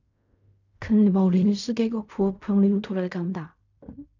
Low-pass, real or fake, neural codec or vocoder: 7.2 kHz; fake; codec, 16 kHz in and 24 kHz out, 0.4 kbps, LongCat-Audio-Codec, fine tuned four codebook decoder